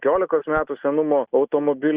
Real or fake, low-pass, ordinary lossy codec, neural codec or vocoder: real; 3.6 kHz; Opus, 64 kbps; none